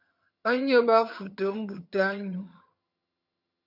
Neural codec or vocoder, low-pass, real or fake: vocoder, 22.05 kHz, 80 mel bands, HiFi-GAN; 5.4 kHz; fake